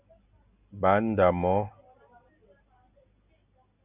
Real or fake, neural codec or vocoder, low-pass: real; none; 3.6 kHz